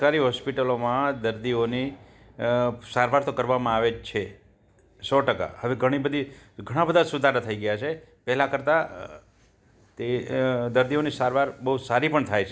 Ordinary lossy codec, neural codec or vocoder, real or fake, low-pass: none; none; real; none